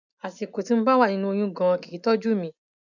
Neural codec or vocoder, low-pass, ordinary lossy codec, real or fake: none; 7.2 kHz; none; real